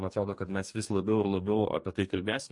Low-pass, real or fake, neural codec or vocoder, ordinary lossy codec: 10.8 kHz; fake; codec, 44.1 kHz, 2.6 kbps, DAC; MP3, 48 kbps